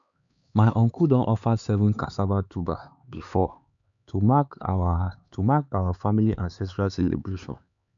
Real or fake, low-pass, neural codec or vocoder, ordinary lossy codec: fake; 7.2 kHz; codec, 16 kHz, 4 kbps, X-Codec, HuBERT features, trained on LibriSpeech; none